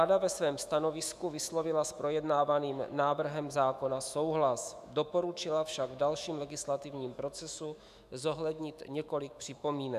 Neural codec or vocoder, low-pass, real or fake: autoencoder, 48 kHz, 128 numbers a frame, DAC-VAE, trained on Japanese speech; 14.4 kHz; fake